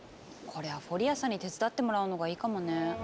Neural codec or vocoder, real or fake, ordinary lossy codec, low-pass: none; real; none; none